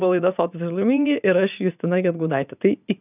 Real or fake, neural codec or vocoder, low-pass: fake; vocoder, 44.1 kHz, 80 mel bands, Vocos; 3.6 kHz